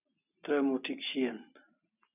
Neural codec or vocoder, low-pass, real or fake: none; 3.6 kHz; real